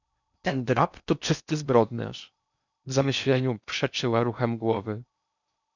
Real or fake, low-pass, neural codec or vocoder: fake; 7.2 kHz; codec, 16 kHz in and 24 kHz out, 0.6 kbps, FocalCodec, streaming, 4096 codes